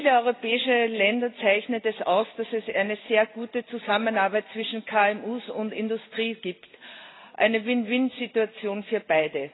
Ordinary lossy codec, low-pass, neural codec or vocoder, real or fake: AAC, 16 kbps; 7.2 kHz; none; real